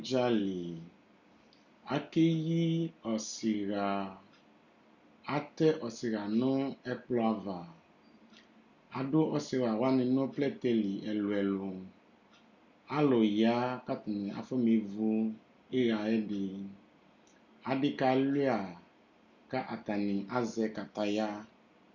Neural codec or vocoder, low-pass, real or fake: none; 7.2 kHz; real